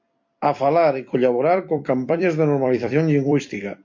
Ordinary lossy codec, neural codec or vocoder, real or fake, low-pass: MP3, 48 kbps; vocoder, 44.1 kHz, 128 mel bands every 256 samples, BigVGAN v2; fake; 7.2 kHz